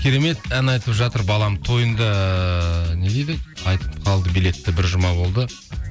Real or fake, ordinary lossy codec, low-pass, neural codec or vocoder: real; none; none; none